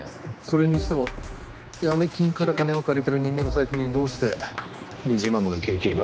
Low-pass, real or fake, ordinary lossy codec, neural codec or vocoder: none; fake; none; codec, 16 kHz, 2 kbps, X-Codec, HuBERT features, trained on general audio